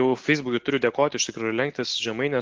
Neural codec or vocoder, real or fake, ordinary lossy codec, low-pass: none; real; Opus, 16 kbps; 7.2 kHz